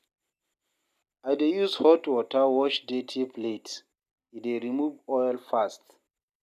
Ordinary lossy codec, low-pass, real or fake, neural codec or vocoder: none; 14.4 kHz; real; none